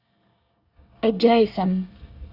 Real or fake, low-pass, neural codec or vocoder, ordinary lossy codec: fake; 5.4 kHz; codec, 24 kHz, 1 kbps, SNAC; Opus, 64 kbps